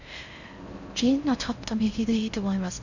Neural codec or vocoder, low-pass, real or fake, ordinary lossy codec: codec, 16 kHz in and 24 kHz out, 0.6 kbps, FocalCodec, streaming, 4096 codes; 7.2 kHz; fake; none